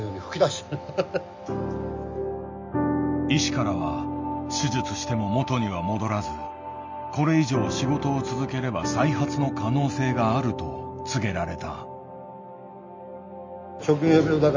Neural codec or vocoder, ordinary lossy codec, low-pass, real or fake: none; MP3, 64 kbps; 7.2 kHz; real